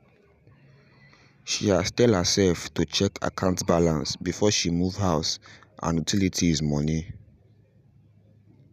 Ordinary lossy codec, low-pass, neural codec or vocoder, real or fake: none; 14.4 kHz; none; real